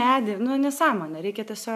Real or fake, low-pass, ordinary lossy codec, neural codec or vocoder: real; 14.4 kHz; MP3, 96 kbps; none